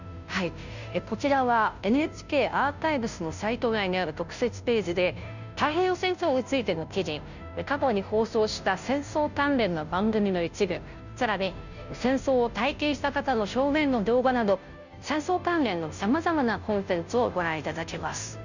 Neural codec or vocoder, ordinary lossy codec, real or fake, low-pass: codec, 16 kHz, 0.5 kbps, FunCodec, trained on Chinese and English, 25 frames a second; none; fake; 7.2 kHz